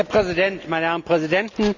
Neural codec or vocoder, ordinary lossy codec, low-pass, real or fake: none; none; 7.2 kHz; real